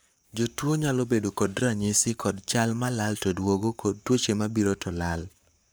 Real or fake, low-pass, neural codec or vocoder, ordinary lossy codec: fake; none; codec, 44.1 kHz, 7.8 kbps, Pupu-Codec; none